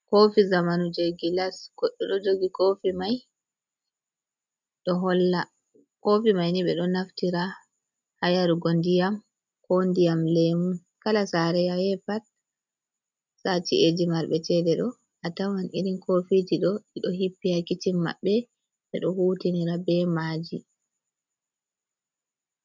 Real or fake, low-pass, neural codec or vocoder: real; 7.2 kHz; none